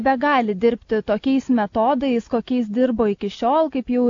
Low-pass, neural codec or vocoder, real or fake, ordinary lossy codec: 7.2 kHz; none; real; AAC, 48 kbps